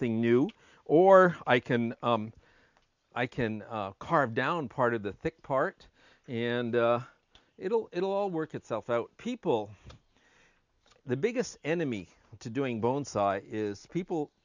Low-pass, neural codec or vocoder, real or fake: 7.2 kHz; none; real